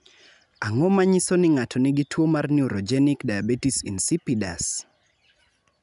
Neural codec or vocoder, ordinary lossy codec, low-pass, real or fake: none; none; 10.8 kHz; real